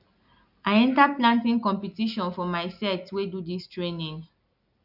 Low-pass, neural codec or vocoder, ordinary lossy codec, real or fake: 5.4 kHz; none; none; real